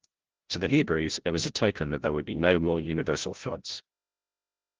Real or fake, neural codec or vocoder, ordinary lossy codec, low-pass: fake; codec, 16 kHz, 0.5 kbps, FreqCodec, larger model; Opus, 16 kbps; 7.2 kHz